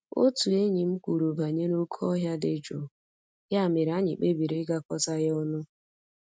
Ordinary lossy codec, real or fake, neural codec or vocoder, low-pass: none; real; none; none